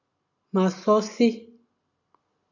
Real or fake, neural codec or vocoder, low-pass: real; none; 7.2 kHz